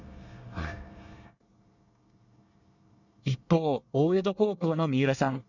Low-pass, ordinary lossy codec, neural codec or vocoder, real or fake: 7.2 kHz; none; codec, 24 kHz, 1 kbps, SNAC; fake